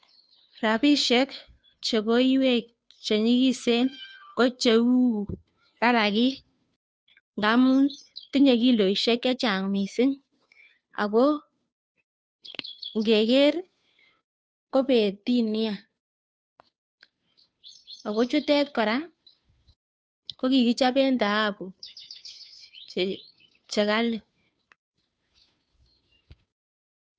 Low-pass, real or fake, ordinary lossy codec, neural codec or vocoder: none; fake; none; codec, 16 kHz, 2 kbps, FunCodec, trained on Chinese and English, 25 frames a second